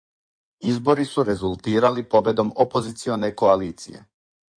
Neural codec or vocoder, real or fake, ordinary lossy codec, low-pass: codec, 16 kHz in and 24 kHz out, 2.2 kbps, FireRedTTS-2 codec; fake; MP3, 48 kbps; 9.9 kHz